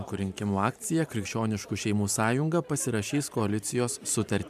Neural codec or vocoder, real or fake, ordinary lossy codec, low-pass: vocoder, 44.1 kHz, 128 mel bands every 512 samples, BigVGAN v2; fake; MP3, 96 kbps; 14.4 kHz